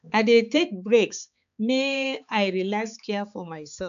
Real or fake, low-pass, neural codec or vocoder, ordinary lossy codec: fake; 7.2 kHz; codec, 16 kHz, 4 kbps, X-Codec, HuBERT features, trained on balanced general audio; none